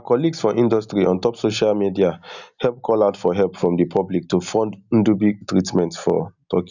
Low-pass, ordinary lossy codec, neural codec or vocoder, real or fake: 7.2 kHz; none; none; real